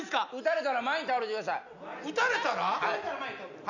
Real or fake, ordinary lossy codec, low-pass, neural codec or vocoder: real; none; 7.2 kHz; none